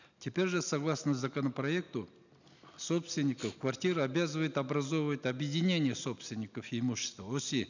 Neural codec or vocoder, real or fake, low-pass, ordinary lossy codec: none; real; 7.2 kHz; none